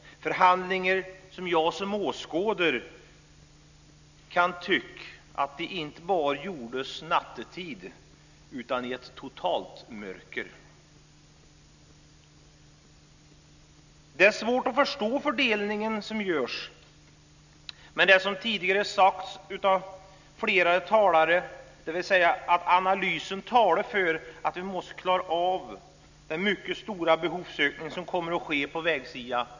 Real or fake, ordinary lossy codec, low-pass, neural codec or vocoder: real; none; 7.2 kHz; none